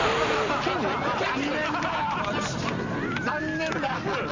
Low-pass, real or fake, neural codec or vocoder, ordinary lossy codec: 7.2 kHz; real; none; MP3, 48 kbps